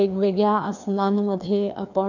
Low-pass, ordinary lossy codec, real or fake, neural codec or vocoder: 7.2 kHz; none; fake; codec, 16 kHz, 2 kbps, FreqCodec, larger model